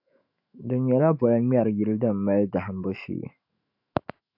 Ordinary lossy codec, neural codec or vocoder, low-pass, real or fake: AAC, 48 kbps; autoencoder, 48 kHz, 128 numbers a frame, DAC-VAE, trained on Japanese speech; 5.4 kHz; fake